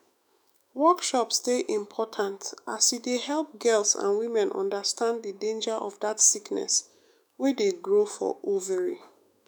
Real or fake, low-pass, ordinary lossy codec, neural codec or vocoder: fake; none; none; autoencoder, 48 kHz, 128 numbers a frame, DAC-VAE, trained on Japanese speech